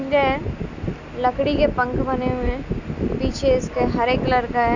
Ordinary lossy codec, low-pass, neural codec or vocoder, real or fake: none; 7.2 kHz; none; real